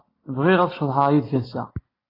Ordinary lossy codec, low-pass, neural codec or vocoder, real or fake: AAC, 24 kbps; 5.4 kHz; none; real